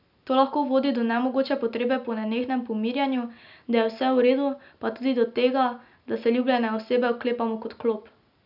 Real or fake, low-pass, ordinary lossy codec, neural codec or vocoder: real; 5.4 kHz; none; none